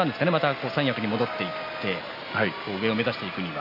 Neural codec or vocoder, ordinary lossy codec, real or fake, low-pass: none; none; real; 5.4 kHz